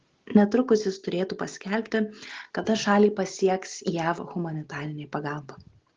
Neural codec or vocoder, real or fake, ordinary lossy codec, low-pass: none; real; Opus, 16 kbps; 7.2 kHz